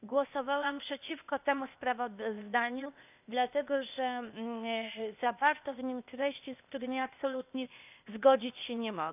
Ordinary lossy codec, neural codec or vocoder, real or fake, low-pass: none; codec, 16 kHz, 0.8 kbps, ZipCodec; fake; 3.6 kHz